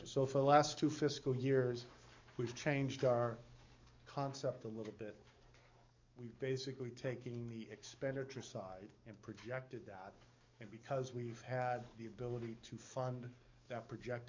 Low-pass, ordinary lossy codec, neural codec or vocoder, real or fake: 7.2 kHz; MP3, 64 kbps; codec, 44.1 kHz, 7.8 kbps, DAC; fake